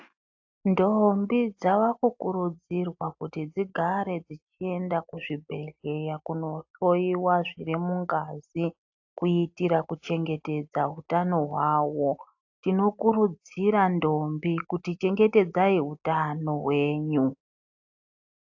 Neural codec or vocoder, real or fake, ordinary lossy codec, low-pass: none; real; AAC, 48 kbps; 7.2 kHz